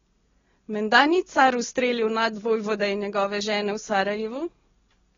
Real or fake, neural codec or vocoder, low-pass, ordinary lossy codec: real; none; 7.2 kHz; AAC, 24 kbps